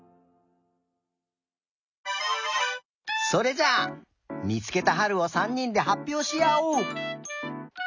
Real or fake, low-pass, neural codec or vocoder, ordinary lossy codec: real; 7.2 kHz; none; none